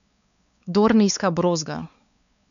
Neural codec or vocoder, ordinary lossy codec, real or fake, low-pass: codec, 16 kHz, 4 kbps, X-Codec, WavLM features, trained on Multilingual LibriSpeech; none; fake; 7.2 kHz